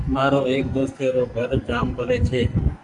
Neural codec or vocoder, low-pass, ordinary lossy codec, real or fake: codec, 44.1 kHz, 2.6 kbps, SNAC; 10.8 kHz; MP3, 96 kbps; fake